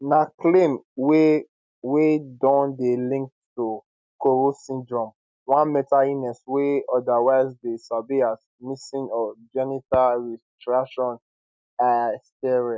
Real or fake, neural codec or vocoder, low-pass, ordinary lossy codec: real; none; none; none